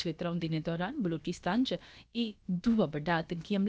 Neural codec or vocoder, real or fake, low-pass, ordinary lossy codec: codec, 16 kHz, about 1 kbps, DyCAST, with the encoder's durations; fake; none; none